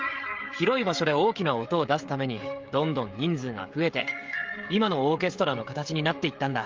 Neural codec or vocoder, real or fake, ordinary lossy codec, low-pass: codec, 16 kHz, 16 kbps, FreqCodec, smaller model; fake; Opus, 32 kbps; 7.2 kHz